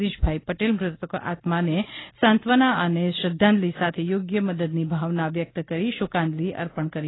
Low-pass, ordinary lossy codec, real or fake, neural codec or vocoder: 7.2 kHz; AAC, 16 kbps; real; none